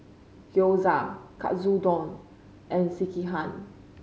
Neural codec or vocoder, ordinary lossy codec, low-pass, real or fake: none; none; none; real